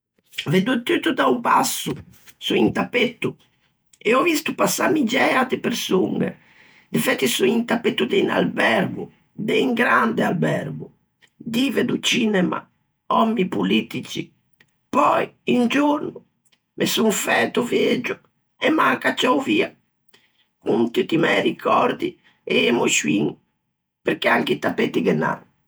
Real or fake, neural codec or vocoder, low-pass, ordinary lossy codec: fake; vocoder, 48 kHz, 128 mel bands, Vocos; none; none